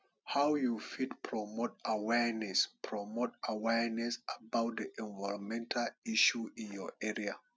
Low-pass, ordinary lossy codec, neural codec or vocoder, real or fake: none; none; none; real